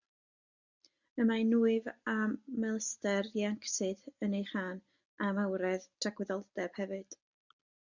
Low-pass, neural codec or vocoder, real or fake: 7.2 kHz; none; real